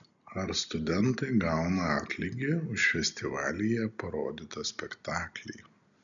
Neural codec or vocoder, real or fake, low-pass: none; real; 7.2 kHz